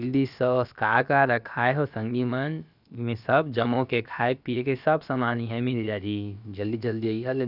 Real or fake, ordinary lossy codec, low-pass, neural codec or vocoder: fake; none; 5.4 kHz; codec, 16 kHz, about 1 kbps, DyCAST, with the encoder's durations